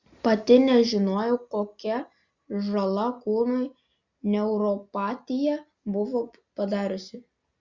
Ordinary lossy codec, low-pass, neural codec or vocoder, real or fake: Opus, 64 kbps; 7.2 kHz; none; real